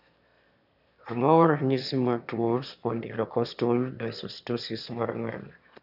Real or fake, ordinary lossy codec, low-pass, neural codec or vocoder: fake; none; 5.4 kHz; autoencoder, 22.05 kHz, a latent of 192 numbers a frame, VITS, trained on one speaker